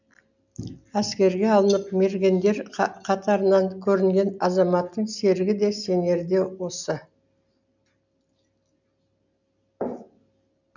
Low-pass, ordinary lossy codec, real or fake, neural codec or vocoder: 7.2 kHz; none; real; none